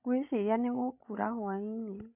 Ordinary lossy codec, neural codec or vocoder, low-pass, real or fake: AAC, 32 kbps; codec, 16 kHz, 16 kbps, FreqCodec, larger model; 3.6 kHz; fake